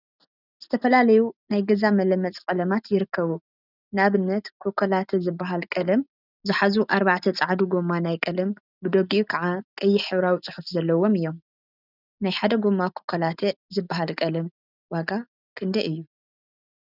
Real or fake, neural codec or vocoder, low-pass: real; none; 5.4 kHz